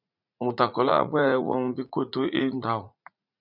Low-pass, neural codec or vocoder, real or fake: 5.4 kHz; vocoder, 44.1 kHz, 80 mel bands, Vocos; fake